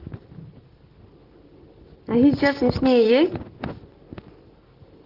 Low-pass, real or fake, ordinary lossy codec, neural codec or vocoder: 5.4 kHz; real; Opus, 16 kbps; none